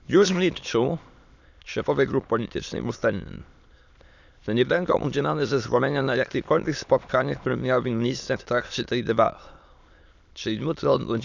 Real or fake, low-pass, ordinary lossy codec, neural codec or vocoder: fake; 7.2 kHz; none; autoencoder, 22.05 kHz, a latent of 192 numbers a frame, VITS, trained on many speakers